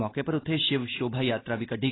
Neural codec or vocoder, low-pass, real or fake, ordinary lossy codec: none; 7.2 kHz; real; AAC, 16 kbps